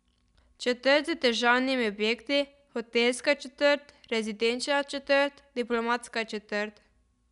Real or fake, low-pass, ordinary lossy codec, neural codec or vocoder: real; 10.8 kHz; none; none